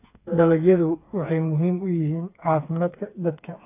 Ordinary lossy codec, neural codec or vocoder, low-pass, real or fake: AAC, 24 kbps; codec, 16 kHz, 4 kbps, FreqCodec, smaller model; 3.6 kHz; fake